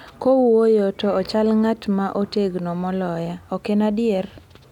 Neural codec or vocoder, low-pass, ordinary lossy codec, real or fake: none; 19.8 kHz; none; real